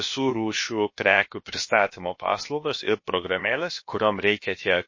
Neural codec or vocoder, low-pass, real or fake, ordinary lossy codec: codec, 16 kHz, about 1 kbps, DyCAST, with the encoder's durations; 7.2 kHz; fake; MP3, 32 kbps